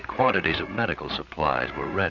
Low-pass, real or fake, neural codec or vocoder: 7.2 kHz; fake; codec, 16 kHz, 8 kbps, FreqCodec, larger model